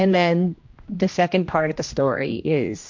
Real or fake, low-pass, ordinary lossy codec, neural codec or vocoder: fake; 7.2 kHz; MP3, 48 kbps; codec, 16 kHz, 1 kbps, X-Codec, HuBERT features, trained on general audio